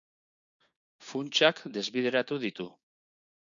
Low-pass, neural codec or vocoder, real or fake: 7.2 kHz; codec, 16 kHz, 6 kbps, DAC; fake